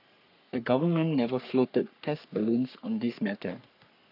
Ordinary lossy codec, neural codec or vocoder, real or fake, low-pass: none; codec, 44.1 kHz, 3.4 kbps, Pupu-Codec; fake; 5.4 kHz